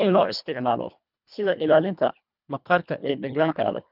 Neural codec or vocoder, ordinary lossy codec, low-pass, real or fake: codec, 24 kHz, 1.5 kbps, HILCodec; none; 5.4 kHz; fake